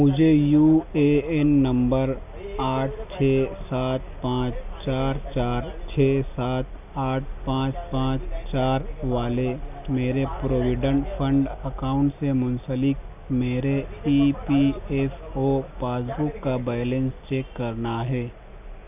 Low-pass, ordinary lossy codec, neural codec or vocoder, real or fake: 3.6 kHz; none; none; real